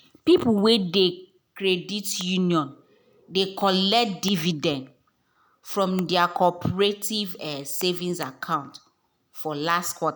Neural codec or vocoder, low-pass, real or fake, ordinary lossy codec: none; none; real; none